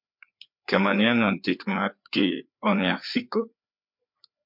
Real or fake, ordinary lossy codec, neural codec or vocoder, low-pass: fake; MP3, 48 kbps; codec, 16 kHz, 4 kbps, FreqCodec, larger model; 5.4 kHz